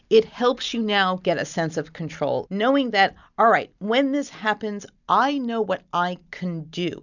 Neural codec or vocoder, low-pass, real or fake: none; 7.2 kHz; real